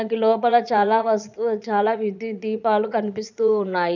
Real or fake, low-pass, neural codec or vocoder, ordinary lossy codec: fake; 7.2 kHz; vocoder, 22.05 kHz, 80 mel bands, Vocos; none